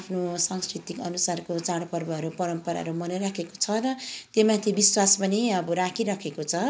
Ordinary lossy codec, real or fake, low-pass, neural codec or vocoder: none; real; none; none